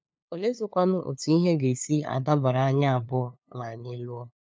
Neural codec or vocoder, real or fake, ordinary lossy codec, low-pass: codec, 16 kHz, 2 kbps, FunCodec, trained on LibriTTS, 25 frames a second; fake; none; none